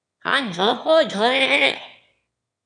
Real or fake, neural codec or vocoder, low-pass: fake; autoencoder, 22.05 kHz, a latent of 192 numbers a frame, VITS, trained on one speaker; 9.9 kHz